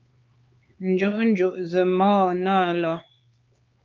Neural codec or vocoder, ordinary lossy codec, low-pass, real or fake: codec, 16 kHz, 4 kbps, X-Codec, HuBERT features, trained on LibriSpeech; Opus, 32 kbps; 7.2 kHz; fake